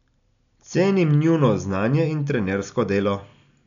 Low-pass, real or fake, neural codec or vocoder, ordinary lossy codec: 7.2 kHz; real; none; none